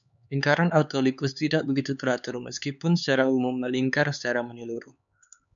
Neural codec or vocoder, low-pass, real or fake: codec, 16 kHz, 4 kbps, X-Codec, HuBERT features, trained on LibriSpeech; 7.2 kHz; fake